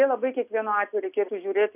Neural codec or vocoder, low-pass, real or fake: none; 3.6 kHz; real